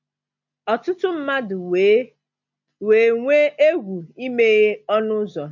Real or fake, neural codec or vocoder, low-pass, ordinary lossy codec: real; none; 7.2 kHz; MP3, 48 kbps